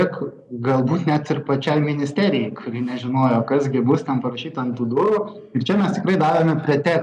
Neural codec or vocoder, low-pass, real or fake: none; 10.8 kHz; real